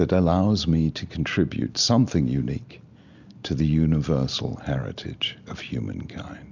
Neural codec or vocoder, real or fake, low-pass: none; real; 7.2 kHz